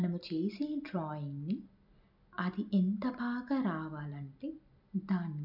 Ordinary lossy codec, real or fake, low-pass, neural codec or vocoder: none; real; 5.4 kHz; none